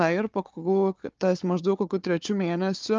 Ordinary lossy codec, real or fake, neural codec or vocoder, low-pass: Opus, 24 kbps; fake; codec, 16 kHz, 4 kbps, FunCodec, trained on Chinese and English, 50 frames a second; 7.2 kHz